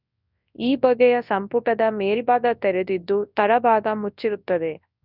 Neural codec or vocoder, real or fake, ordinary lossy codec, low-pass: codec, 24 kHz, 0.9 kbps, WavTokenizer, large speech release; fake; none; 5.4 kHz